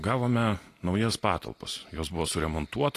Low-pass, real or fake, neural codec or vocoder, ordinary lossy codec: 14.4 kHz; real; none; AAC, 48 kbps